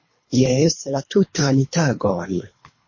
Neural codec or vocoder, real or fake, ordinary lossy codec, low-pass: codec, 24 kHz, 3 kbps, HILCodec; fake; MP3, 32 kbps; 7.2 kHz